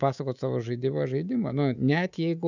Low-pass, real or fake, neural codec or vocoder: 7.2 kHz; fake; codec, 24 kHz, 3.1 kbps, DualCodec